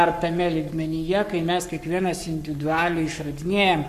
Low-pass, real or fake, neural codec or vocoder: 14.4 kHz; fake; codec, 44.1 kHz, 7.8 kbps, Pupu-Codec